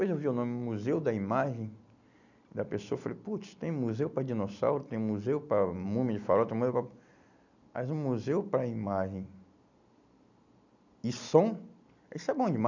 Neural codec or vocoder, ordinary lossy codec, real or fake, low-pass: none; none; real; 7.2 kHz